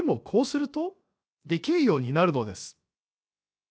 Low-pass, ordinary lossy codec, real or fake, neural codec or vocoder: none; none; fake; codec, 16 kHz, 0.7 kbps, FocalCodec